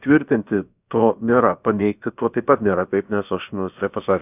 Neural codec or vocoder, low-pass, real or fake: codec, 16 kHz, about 1 kbps, DyCAST, with the encoder's durations; 3.6 kHz; fake